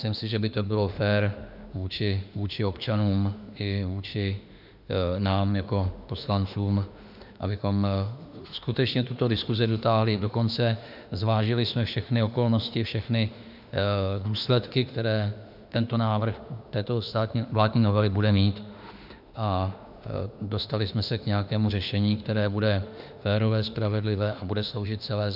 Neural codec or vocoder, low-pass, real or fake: autoencoder, 48 kHz, 32 numbers a frame, DAC-VAE, trained on Japanese speech; 5.4 kHz; fake